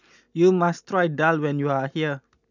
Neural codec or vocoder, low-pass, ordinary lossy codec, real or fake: none; 7.2 kHz; none; real